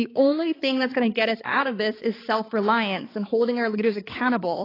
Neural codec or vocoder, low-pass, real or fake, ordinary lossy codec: codec, 16 kHz, 4 kbps, X-Codec, HuBERT features, trained on balanced general audio; 5.4 kHz; fake; AAC, 24 kbps